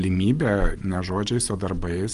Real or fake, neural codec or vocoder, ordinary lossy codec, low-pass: real; none; Opus, 32 kbps; 10.8 kHz